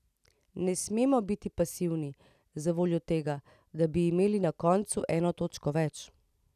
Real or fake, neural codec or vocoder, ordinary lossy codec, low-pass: real; none; none; 14.4 kHz